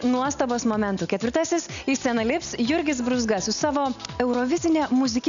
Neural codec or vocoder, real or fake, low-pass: none; real; 7.2 kHz